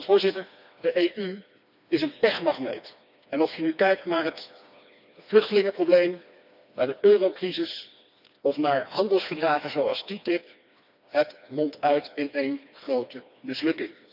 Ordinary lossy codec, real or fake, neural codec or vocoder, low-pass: none; fake; codec, 16 kHz, 2 kbps, FreqCodec, smaller model; 5.4 kHz